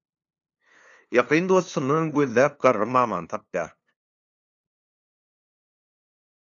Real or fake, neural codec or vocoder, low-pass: fake; codec, 16 kHz, 2 kbps, FunCodec, trained on LibriTTS, 25 frames a second; 7.2 kHz